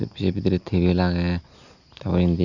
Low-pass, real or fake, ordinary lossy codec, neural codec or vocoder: 7.2 kHz; real; none; none